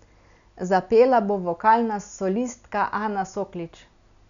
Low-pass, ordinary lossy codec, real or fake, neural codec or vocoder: 7.2 kHz; none; real; none